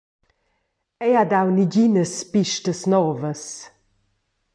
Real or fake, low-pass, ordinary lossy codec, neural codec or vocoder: real; 9.9 kHz; MP3, 64 kbps; none